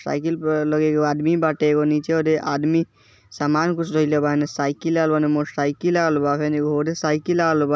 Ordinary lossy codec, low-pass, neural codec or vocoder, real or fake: none; none; none; real